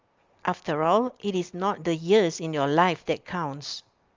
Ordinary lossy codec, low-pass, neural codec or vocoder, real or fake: Opus, 32 kbps; 7.2 kHz; none; real